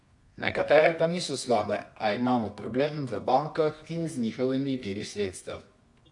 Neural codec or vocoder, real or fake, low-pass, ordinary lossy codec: codec, 24 kHz, 0.9 kbps, WavTokenizer, medium music audio release; fake; 10.8 kHz; AAC, 64 kbps